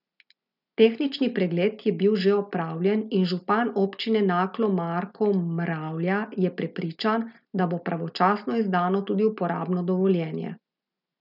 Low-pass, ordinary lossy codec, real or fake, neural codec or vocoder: 5.4 kHz; none; real; none